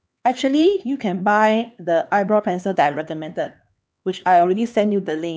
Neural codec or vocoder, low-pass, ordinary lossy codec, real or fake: codec, 16 kHz, 2 kbps, X-Codec, HuBERT features, trained on LibriSpeech; none; none; fake